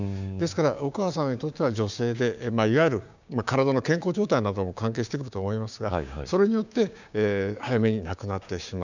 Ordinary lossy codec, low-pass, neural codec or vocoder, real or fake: none; 7.2 kHz; codec, 16 kHz, 6 kbps, DAC; fake